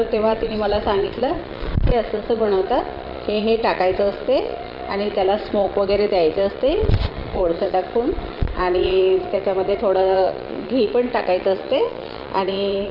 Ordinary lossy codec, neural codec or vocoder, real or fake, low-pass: none; vocoder, 22.05 kHz, 80 mel bands, Vocos; fake; 5.4 kHz